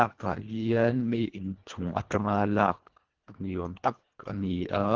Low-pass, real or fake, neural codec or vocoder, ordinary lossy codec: 7.2 kHz; fake; codec, 24 kHz, 1.5 kbps, HILCodec; Opus, 16 kbps